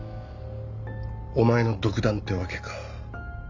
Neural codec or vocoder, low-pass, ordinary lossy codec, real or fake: none; 7.2 kHz; AAC, 48 kbps; real